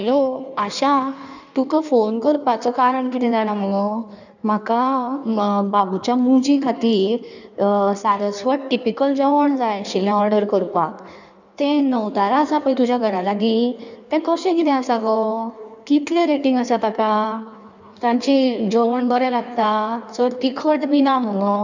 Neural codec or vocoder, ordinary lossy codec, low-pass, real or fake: codec, 16 kHz in and 24 kHz out, 1.1 kbps, FireRedTTS-2 codec; none; 7.2 kHz; fake